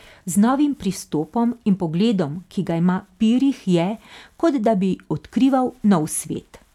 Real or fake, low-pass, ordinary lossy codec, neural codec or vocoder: real; 19.8 kHz; none; none